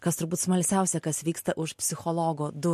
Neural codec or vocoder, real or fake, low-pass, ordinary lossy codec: none; real; 14.4 kHz; MP3, 64 kbps